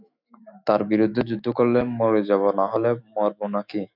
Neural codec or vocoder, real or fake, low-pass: autoencoder, 48 kHz, 128 numbers a frame, DAC-VAE, trained on Japanese speech; fake; 5.4 kHz